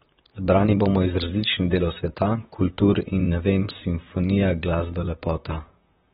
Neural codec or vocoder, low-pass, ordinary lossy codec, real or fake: vocoder, 44.1 kHz, 128 mel bands, Pupu-Vocoder; 19.8 kHz; AAC, 16 kbps; fake